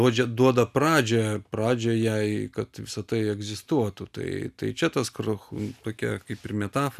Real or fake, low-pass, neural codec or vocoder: real; 14.4 kHz; none